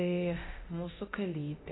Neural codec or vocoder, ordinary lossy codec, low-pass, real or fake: codec, 24 kHz, 0.9 kbps, DualCodec; AAC, 16 kbps; 7.2 kHz; fake